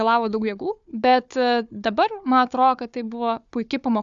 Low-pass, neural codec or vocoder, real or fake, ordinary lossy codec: 7.2 kHz; codec, 16 kHz, 4 kbps, FunCodec, trained on Chinese and English, 50 frames a second; fake; Opus, 64 kbps